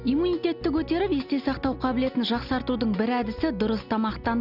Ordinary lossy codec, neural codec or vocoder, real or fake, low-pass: none; none; real; 5.4 kHz